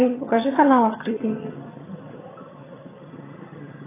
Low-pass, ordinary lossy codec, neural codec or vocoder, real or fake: 3.6 kHz; AAC, 16 kbps; vocoder, 22.05 kHz, 80 mel bands, HiFi-GAN; fake